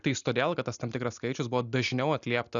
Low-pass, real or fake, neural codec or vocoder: 7.2 kHz; real; none